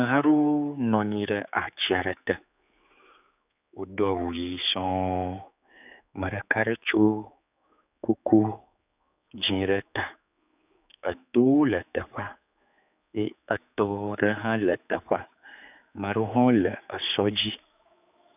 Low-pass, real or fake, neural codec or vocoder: 3.6 kHz; fake; codec, 16 kHz, 4 kbps, X-Codec, HuBERT features, trained on general audio